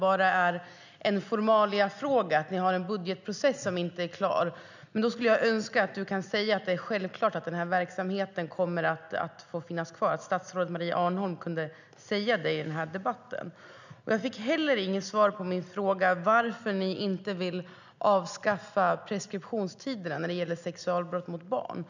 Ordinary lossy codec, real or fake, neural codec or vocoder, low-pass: none; real; none; 7.2 kHz